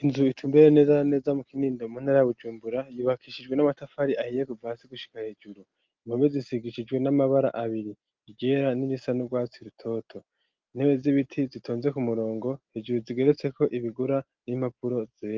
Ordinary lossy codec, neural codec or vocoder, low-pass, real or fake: Opus, 24 kbps; none; 7.2 kHz; real